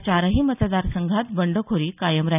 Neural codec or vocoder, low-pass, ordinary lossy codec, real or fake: none; 3.6 kHz; none; real